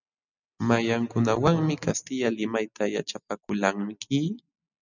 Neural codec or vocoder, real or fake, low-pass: none; real; 7.2 kHz